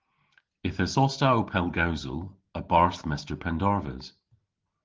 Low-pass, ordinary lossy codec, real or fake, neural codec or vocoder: 7.2 kHz; Opus, 16 kbps; real; none